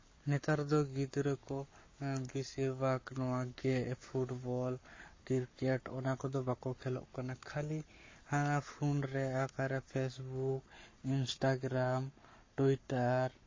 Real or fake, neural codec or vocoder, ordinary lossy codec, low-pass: fake; codec, 44.1 kHz, 7.8 kbps, Pupu-Codec; MP3, 32 kbps; 7.2 kHz